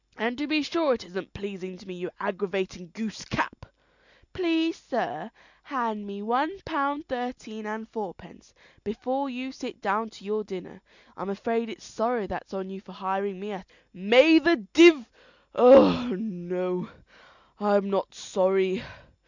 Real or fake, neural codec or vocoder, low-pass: real; none; 7.2 kHz